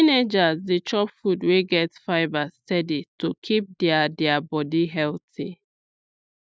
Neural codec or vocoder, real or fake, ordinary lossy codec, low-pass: none; real; none; none